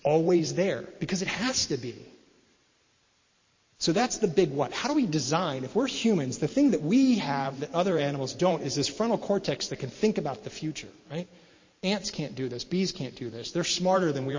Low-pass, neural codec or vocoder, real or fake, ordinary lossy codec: 7.2 kHz; vocoder, 44.1 kHz, 128 mel bands every 512 samples, BigVGAN v2; fake; MP3, 32 kbps